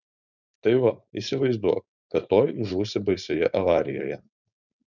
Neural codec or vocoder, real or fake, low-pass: codec, 16 kHz, 4.8 kbps, FACodec; fake; 7.2 kHz